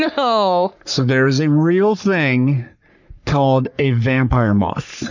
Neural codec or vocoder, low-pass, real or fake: codec, 44.1 kHz, 3.4 kbps, Pupu-Codec; 7.2 kHz; fake